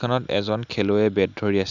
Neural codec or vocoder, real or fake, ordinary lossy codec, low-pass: none; real; none; 7.2 kHz